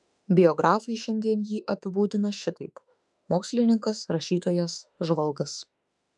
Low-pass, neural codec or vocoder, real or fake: 10.8 kHz; autoencoder, 48 kHz, 32 numbers a frame, DAC-VAE, trained on Japanese speech; fake